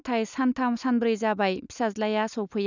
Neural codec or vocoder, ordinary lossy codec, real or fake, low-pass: none; none; real; 7.2 kHz